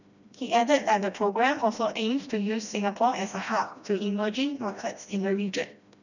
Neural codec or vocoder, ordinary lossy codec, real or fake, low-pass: codec, 16 kHz, 1 kbps, FreqCodec, smaller model; none; fake; 7.2 kHz